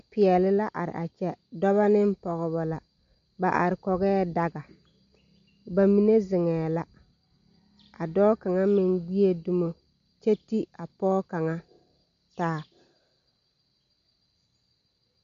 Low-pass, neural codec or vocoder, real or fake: 7.2 kHz; none; real